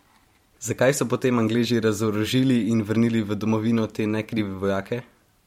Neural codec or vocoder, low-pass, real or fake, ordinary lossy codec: vocoder, 44.1 kHz, 128 mel bands every 512 samples, BigVGAN v2; 19.8 kHz; fake; MP3, 64 kbps